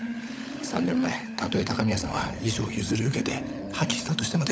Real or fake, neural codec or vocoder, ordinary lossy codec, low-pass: fake; codec, 16 kHz, 16 kbps, FunCodec, trained on LibriTTS, 50 frames a second; none; none